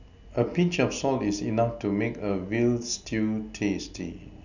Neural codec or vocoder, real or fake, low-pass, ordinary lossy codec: none; real; 7.2 kHz; none